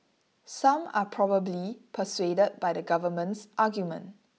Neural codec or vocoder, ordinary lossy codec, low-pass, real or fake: none; none; none; real